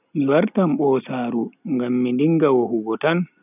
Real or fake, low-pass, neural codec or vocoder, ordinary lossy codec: real; 3.6 kHz; none; none